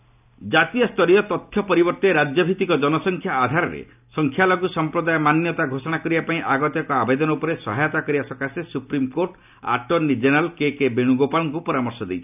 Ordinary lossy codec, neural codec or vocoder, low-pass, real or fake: none; none; 3.6 kHz; real